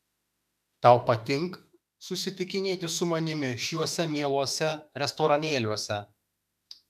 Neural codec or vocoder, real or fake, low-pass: autoencoder, 48 kHz, 32 numbers a frame, DAC-VAE, trained on Japanese speech; fake; 14.4 kHz